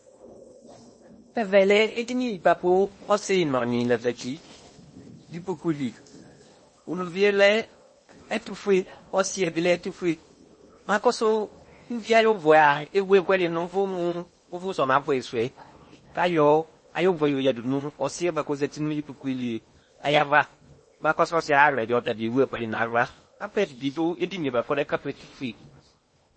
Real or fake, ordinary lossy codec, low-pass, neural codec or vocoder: fake; MP3, 32 kbps; 9.9 kHz; codec, 16 kHz in and 24 kHz out, 0.8 kbps, FocalCodec, streaming, 65536 codes